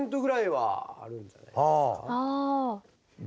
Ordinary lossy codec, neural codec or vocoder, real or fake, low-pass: none; none; real; none